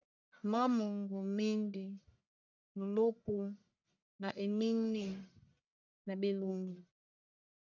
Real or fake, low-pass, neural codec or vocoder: fake; 7.2 kHz; codec, 44.1 kHz, 1.7 kbps, Pupu-Codec